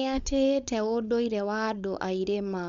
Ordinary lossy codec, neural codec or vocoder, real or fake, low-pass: MP3, 64 kbps; codec, 16 kHz, 4.8 kbps, FACodec; fake; 7.2 kHz